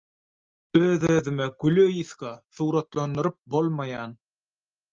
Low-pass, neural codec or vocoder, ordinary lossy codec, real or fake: 7.2 kHz; none; Opus, 24 kbps; real